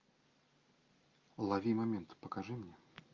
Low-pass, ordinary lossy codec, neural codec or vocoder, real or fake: 7.2 kHz; Opus, 32 kbps; none; real